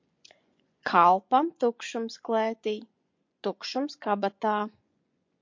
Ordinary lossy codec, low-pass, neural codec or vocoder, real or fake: MP3, 48 kbps; 7.2 kHz; vocoder, 24 kHz, 100 mel bands, Vocos; fake